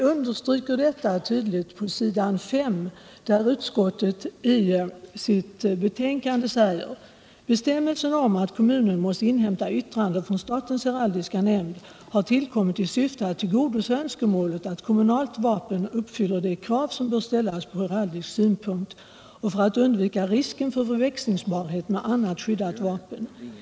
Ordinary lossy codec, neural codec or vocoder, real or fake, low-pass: none; none; real; none